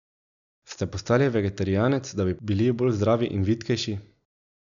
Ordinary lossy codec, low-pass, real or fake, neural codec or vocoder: none; 7.2 kHz; real; none